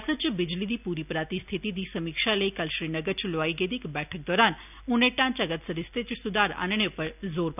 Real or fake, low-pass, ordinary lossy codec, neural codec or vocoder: real; 3.6 kHz; none; none